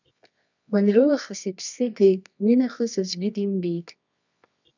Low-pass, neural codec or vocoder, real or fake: 7.2 kHz; codec, 24 kHz, 0.9 kbps, WavTokenizer, medium music audio release; fake